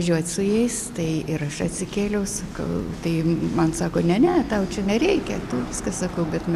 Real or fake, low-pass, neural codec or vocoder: real; 14.4 kHz; none